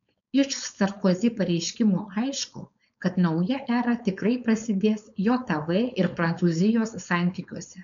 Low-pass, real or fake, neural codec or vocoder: 7.2 kHz; fake; codec, 16 kHz, 4.8 kbps, FACodec